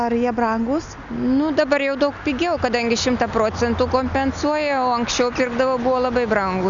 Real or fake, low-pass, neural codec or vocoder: real; 7.2 kHz; none